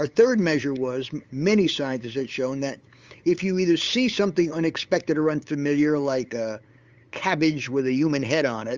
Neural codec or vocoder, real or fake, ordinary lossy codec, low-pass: codec, 16 kHz, 8 kbps, FunCodec, trained on LibriTTS, 25 frames a second; fake; Opus, 32 kbps; 7.2 kHz